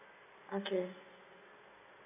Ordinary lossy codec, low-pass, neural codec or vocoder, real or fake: AAC, 16 kbps; 3.6 kHz; none; real